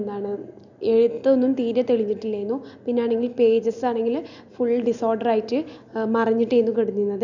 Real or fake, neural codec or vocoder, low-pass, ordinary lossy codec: real; none; 7.2 kHz; none